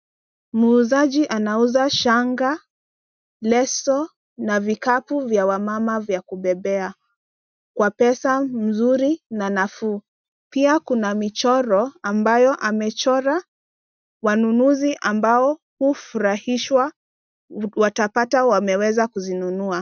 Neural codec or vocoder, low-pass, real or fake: none; 7.2 kHz; real